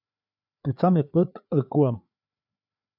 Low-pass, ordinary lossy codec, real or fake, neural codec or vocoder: 5.4 kHz; AAC, 48 kbps; fake; codec, 16 kHz, 4 kbps, FreqCodec, larger model